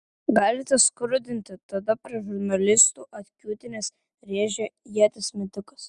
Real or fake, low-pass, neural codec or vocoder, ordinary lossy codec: real; 10.8 kHz; none; Opus, 64 kbps